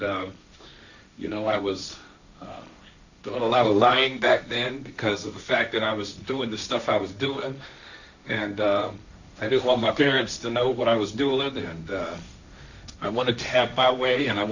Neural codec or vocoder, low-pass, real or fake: codec, 16 kHz, 1.1 kbps, Voila-Tokenizer; 7.2 kHz; fake